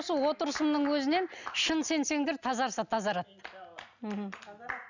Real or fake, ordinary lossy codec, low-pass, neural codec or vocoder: real; none; 7.2 kHz; none